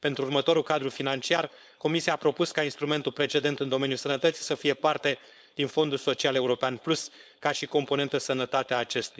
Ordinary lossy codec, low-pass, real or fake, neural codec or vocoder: none; none; fake; codec, 16 kHz, 4.8 kbps, FACodec